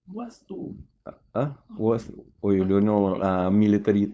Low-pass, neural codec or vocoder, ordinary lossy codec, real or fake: none; codec, 16 kHz, 4.8 kbps, FACodec; none; fake